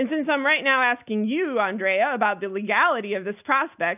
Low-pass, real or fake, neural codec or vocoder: 3.6 kHz; real; none